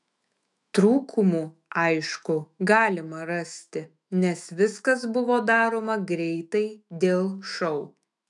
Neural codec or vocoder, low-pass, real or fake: autoencoder, 48 kHz, 128 numbers a frame, DAC-VAE, trained on Japanese speech; 10.8 kHz; fake